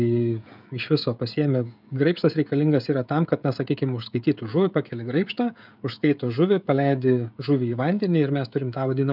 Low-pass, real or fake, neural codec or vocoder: 5.4 kHz; fake; codec, 16 kHz, 16 kbps, FreqCodec, smaller model